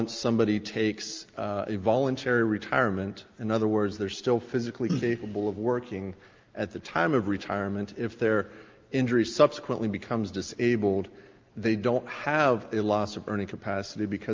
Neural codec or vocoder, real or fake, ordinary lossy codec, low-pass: none; real; Opus, 32 kbps; 7.2 kHz